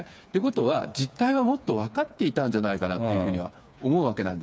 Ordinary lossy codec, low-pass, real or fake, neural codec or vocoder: none; none; fake; codec, 16 kHz, 4 kbps, FreqCodec, smaller model